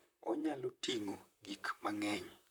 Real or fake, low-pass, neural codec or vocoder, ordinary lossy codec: fake; none; vocoder, 44.1 kHz, 128 mel bands, Pupu-Vocoder; none